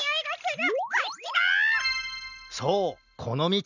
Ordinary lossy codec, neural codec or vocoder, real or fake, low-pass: none; none; real; 7.2 kHz